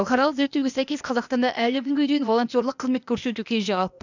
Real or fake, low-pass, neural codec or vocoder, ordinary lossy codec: fake; 7.2 kHz; codec, 16 kHz, 0.8 kbps, ZipCodec; none